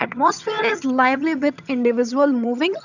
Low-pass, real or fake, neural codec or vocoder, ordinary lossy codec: 7.2 kHz; fake; vocoder, 22.05 kHz, 80 mel bands, HiFi-GAN; none